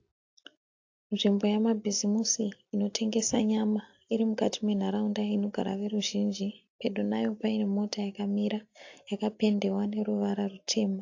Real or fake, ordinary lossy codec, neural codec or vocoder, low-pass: real; AAC, 48 kbps; none; 7.2 kHz